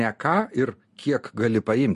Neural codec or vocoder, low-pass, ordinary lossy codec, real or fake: none; 14.4 kHz; MP3, 48 kbps; real